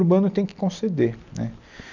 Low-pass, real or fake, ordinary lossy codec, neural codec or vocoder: 7.2 kHz; real; none; none